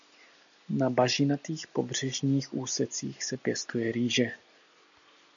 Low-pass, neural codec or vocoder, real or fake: 7.2 kHz; none; real